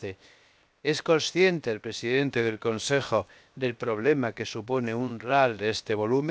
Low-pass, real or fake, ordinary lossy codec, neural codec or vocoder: none; fake; none; codec, 16 kHz, 0.7 kbps, FocalCodec